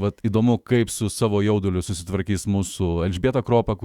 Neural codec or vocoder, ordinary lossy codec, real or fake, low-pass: autoencoder, 48 kHz, 128 numbers a frame, DAC-VAE, trained on Japanese speech; Opus, 64 kbps; fake; 19.8 kHz